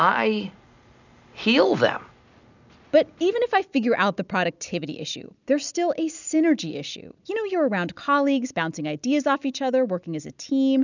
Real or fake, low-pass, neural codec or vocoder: real; 7.2 kHz; none